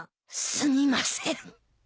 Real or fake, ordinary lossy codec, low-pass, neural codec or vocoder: real; none; none; none